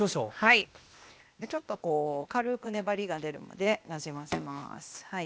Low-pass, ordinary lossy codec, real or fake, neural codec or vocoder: none; none; fake; codec, 16 kHz, 0.8 kbps, ZipCodec